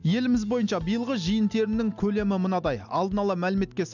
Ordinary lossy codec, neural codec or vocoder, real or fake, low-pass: none; none; real; 7.2 kHz